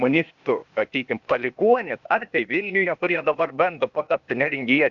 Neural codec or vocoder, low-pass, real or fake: codec, 16 kHz, 0.8 kbps, ZipCodec; 7.2 kHz; fake